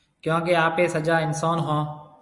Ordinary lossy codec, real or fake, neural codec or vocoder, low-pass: Opus, 64 kbps; real; none; 10.8 kHz